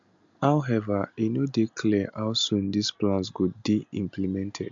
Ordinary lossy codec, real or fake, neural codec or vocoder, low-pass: MP3, 64 kbps; real; none; 7.2 kHz